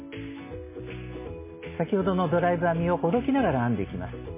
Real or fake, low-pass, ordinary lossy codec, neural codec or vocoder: real; 3.6 kHz; MP3, 16 kbps; none